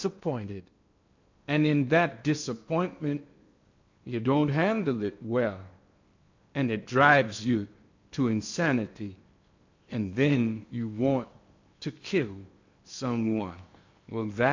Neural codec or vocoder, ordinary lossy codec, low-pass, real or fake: codec, 16 kHz in and 24 kHz out, 0.8 kbps, FocalCodec, streaming, 65536 codes; MP3, 48 kbps; 7.2 kHz; fake